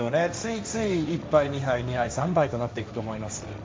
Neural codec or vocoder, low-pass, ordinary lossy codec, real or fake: codec, 16 kHz, 1.1 kbps, Voila-Tokenizer; none; none; fake